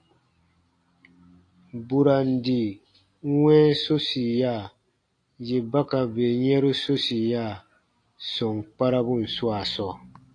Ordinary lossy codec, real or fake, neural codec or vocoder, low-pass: AAC, 48 kbps; real; none; 9.9 kHz